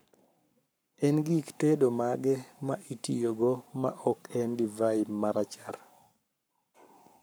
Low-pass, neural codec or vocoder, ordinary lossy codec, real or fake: none; codec, 44.1 kHz, 7.8 kbps, Pupu-Codec; none; fake